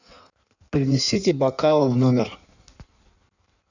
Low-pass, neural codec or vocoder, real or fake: 7.2 kHz; codec, 16 kHz in and 24 kHz out, 1.1 kbps, FireRedTTS-2 codec; fake